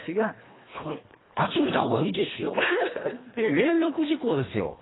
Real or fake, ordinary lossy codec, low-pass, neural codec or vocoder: fake; AAC, 16 kbps; 7.2 kHz; codec, 24 kHz, 1.5 kbps, HILCodec